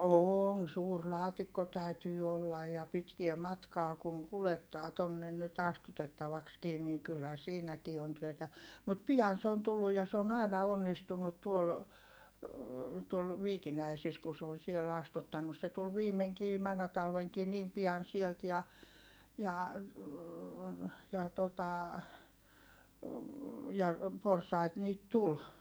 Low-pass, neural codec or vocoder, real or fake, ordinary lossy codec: none; codec, 44.1 kHz, 2.6 kbps, SNAC; fake; none